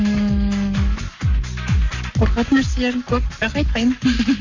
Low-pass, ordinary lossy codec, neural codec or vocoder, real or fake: 7.2 kHz; Opus, 64 kbps; codec, 44.1 kHz, 7.8 kbps, Pupu-Codec; fake